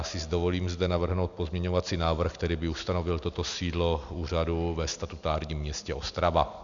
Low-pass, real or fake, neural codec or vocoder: 7.2 kHz; real; none